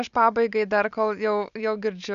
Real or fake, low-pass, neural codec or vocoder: real; 7.2 kHz; none